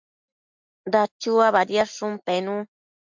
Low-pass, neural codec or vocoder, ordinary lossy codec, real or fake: 7.2 kHz; none; MP3, 64 kbps; real